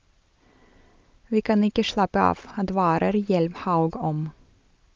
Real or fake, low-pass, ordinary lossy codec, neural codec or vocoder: real; 7.2 kHz; Opus, 24 kbps; none